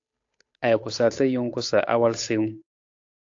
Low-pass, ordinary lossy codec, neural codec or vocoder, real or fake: 7.2 kHz; AAC, 48 kbps; codec, 16 kHz, 8 kbps, FunCodec, trained on Chinese and English, 25 frames a second; fake